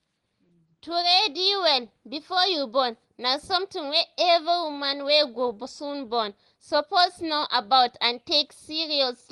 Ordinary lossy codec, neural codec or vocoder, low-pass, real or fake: Opus, 24 kbps; none; 10.8 kHz; real